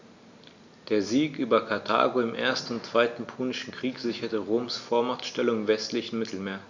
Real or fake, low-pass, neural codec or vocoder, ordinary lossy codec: real; 7.2 kHz; none; MP3, 64 kbps